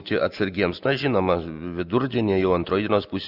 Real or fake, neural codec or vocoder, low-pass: real; none; 5.4 kHz